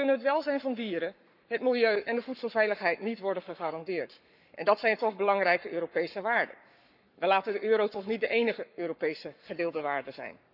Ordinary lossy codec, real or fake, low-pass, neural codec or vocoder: none; fake; 5.4 kHz; codec, 44.1 kHz, 7.8 kbps, Pupu-Codec